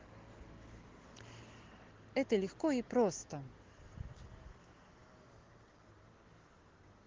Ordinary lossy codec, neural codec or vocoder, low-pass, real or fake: Opus, 16 kbps; none; 7.2 kHz; real